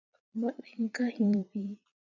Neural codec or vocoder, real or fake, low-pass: vocoder, 22.05 kHz, 80 mel bands, Vocos; fake; 7.2 kHz